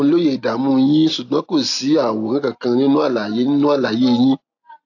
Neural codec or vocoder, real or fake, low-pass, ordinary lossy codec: none; real; 7.2 kHz; AAC, 48 kbps